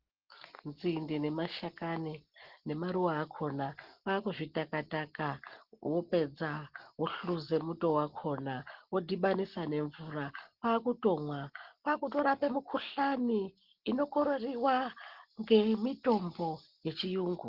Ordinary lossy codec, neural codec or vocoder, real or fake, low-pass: Opus, 16 kbps; none; real; 5.4 kHz